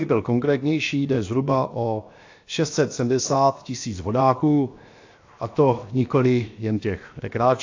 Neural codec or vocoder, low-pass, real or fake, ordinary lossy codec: codec, 16 kHz, 0.7 kbps, FocalCodec; 7.2 kHz; fake; AAC, 48 kbps